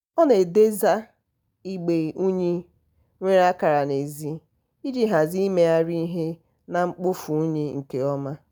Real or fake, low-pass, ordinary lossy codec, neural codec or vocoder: real; none; none; none